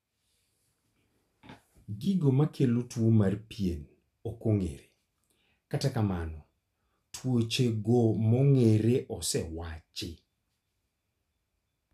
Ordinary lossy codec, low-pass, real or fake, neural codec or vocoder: none; 14.4 kHz; real; none